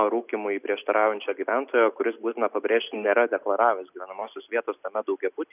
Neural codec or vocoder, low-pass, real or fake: none; 3.6 kHz; real